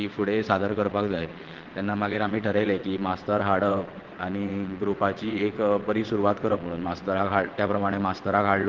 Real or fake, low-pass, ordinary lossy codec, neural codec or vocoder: fake; 7.2 kHz; Opus, 16 kbps; vocoder, 22.05 kHz, 80 mel bands, WaveNeXt